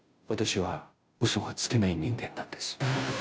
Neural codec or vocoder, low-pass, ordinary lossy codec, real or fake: codec, 16 kHz, 0.5 kbps, FunCodec, trained on Chinese and English, 25 frames a second; none; none; fake